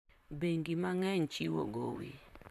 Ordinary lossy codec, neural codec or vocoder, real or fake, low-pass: AAC, 96 kbps; vocoder, 44.1 kHz, 128 mel bands, Pupu-Vocoder; fake; 14.4 kHz